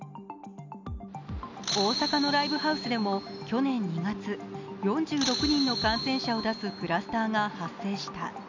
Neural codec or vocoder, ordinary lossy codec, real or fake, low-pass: none; none; real; 7.2 kHz